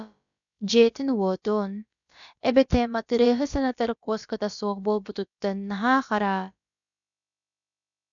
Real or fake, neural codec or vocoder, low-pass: fake; codec, 16 kHz, about 1 kbps, DyCAST, with the encoder's durations; 7.2 kHz